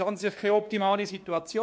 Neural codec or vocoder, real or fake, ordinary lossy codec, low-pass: codec, 16 kHz, 2 kbps, X-Codec, WavLM features, trained on Multilingual LibriSpeech; fake; none; none